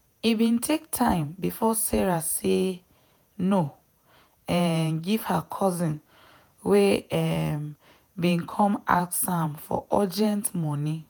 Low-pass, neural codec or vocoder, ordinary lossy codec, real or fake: none; vocoder, 48 kHz, 128 mel bands, Vocos; none; fake